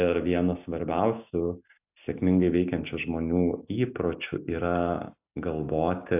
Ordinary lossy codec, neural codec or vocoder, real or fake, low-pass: AAC, 32 kbps; none; real; 3.6 kHz